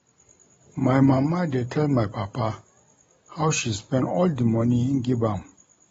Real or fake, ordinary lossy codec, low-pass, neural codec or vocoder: real; AAC, 24 kbps; 19.8 kHz; none